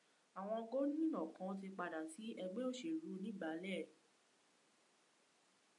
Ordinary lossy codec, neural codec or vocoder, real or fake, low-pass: MP3, 96 kbps; none; real; 10.8 kHz